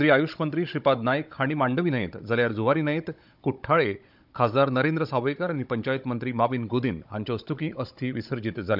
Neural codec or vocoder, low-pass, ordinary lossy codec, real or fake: codec, 16 kHz, 16 kbps, FunCodec, trained on Chinese and English, 50 frames a second; 5.4 kHz; none; fake